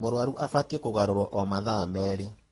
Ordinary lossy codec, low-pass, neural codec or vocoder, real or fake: AAC, 32 kbps; 10.8 kHz; codec, 24 kHz, 3 kbps, HILCodec; fake